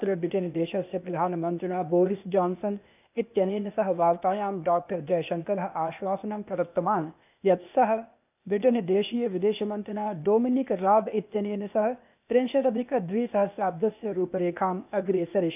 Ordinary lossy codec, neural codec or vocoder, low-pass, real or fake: none; codec, 16 kHz, 0.8 kbps, ZipCodec; 3.6 kHz; fake